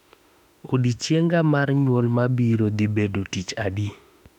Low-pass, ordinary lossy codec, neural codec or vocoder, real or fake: 19.8 kHz; none; autoencoder, 48 kHz, 32 numbers a frame, DAC-VAE, trained on Japanese speech; fake